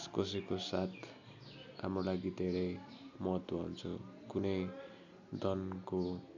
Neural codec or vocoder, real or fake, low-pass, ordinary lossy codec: none; real; 7.2 kHz; none